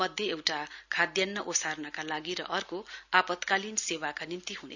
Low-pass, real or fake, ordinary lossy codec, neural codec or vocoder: 7.2 kHz; real; none; none